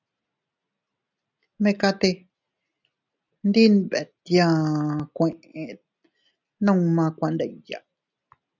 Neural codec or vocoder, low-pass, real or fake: none; 7.2 kHz; real